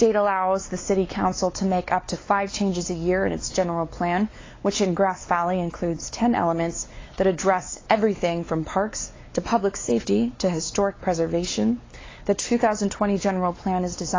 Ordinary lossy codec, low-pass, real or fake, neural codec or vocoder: AAC, 32 kbps; 7.2 kHz; fake; codec, 16 kHz, 4 kbps, X-Codec, WavLM features, trained on Multilingual LibriSpeech